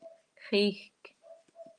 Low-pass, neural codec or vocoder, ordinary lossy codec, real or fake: 9.9 kHz; none; Opus, 32 kbps; real